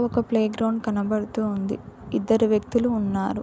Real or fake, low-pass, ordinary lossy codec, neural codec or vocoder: real; none; none; none